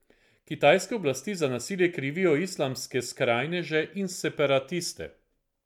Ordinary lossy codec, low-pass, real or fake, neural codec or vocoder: MP3, 96 kbps; 19.8 kHz; real; none